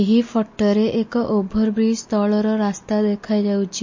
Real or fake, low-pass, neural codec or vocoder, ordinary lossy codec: real; 7.2 kHz; none; MP3, 32 kbps